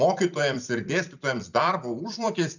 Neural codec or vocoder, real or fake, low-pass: vocoder, 44.1 kHz, 128 mel bands every 256 samples, BigVGAN v2; fake; 7.2 kHz